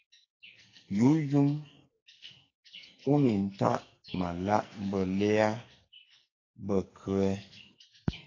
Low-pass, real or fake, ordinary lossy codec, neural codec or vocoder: 7.2 kHz; fake; AAC, 32 kbps; codec, 44.1 kHz, 2.6 kbps, SNAC